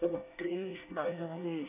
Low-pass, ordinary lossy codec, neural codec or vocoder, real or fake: 3.6 kHz; none; codec, 24 kHz, 1 kbps, SNAC; fake